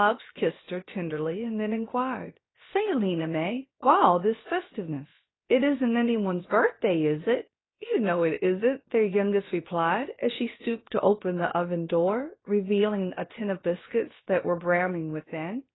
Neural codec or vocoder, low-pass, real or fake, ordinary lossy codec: codec, 16 kHz, about 1 kbps, DyCAST, with the encoder's durations; 7.2 kHz; fake; AAC, 16 kbps